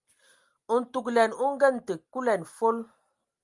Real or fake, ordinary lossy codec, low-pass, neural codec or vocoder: real; Opus, 32 kbps; 10.8 kHz; none